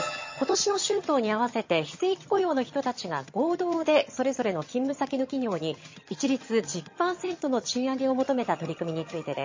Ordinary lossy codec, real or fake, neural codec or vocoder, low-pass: MP3, 32 kbps; fake; vocoder, 22.05 kHz, 80 mel bands, HiFi-GAN; 7.2 kHz